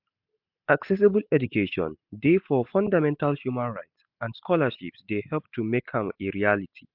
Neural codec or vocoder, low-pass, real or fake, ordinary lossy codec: none; 5.4 kHz; real; MP3, 48 kbps